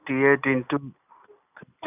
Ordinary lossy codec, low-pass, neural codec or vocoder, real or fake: none; 3.6 kHz; none; real